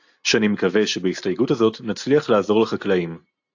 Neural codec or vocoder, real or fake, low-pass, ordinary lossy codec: none; real; 7.2 kHz; AAC, 48 kbps